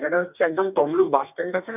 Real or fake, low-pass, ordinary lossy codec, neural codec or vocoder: fake; 3.6 kHz; none; codec, 44.1 kHz, 2.6 kbps, DAC